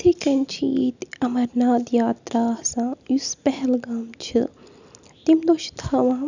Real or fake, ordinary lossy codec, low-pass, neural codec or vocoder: real; none; 7.2 kHz; none